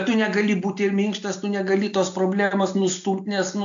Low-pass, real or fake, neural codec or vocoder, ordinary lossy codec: 7.2 kHz; real; none; AAC, 64 kbps